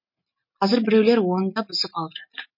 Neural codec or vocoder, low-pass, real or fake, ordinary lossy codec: none; 5.4 kHz; real; MP3, 32 kbps